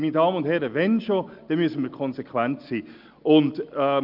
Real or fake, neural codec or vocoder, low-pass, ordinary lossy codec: real; none; 5.4 kHz; Opus, 24 kbps